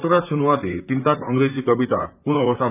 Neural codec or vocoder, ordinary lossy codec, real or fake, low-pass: vocoder, 44.1 kHz, 128 mel bands, Pupu-Vocoder; none; fake; 3.6 kHz